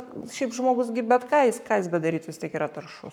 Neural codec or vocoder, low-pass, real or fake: codec, 44.1 kHz, 7.8 kbps, Pupu-Codec; 19.8 kHz; fake